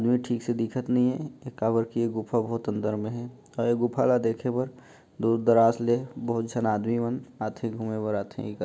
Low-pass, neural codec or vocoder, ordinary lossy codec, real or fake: none; none; none; real